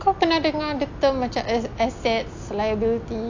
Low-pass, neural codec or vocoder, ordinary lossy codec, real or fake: 7.2 kHz; none; AAC, 48 kbps; real